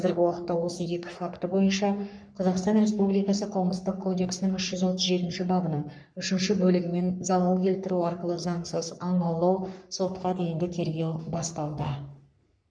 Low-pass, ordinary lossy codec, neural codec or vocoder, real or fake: 9.9 kHz; none; codec, 44.1 kHz, 3.4 kbps, Pupu-Codec; fake